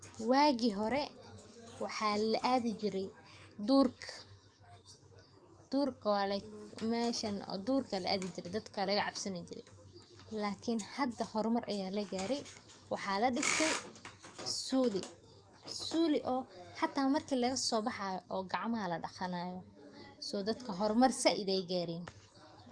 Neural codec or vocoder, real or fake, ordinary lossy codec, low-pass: codec, 44.1 kHz, 7.8 kbps, DAC; fake; Opus, 64 kbps; 9.9 kHz